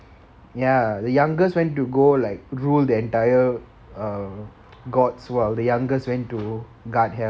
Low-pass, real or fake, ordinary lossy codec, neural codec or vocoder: none; real; none; none